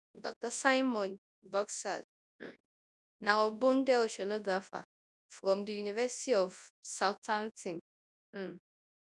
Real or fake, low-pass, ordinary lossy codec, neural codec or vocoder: fake; 10.8 kHz; none; codec, 24 kHz, 0.9 kbps, WavTokenizer, large speech release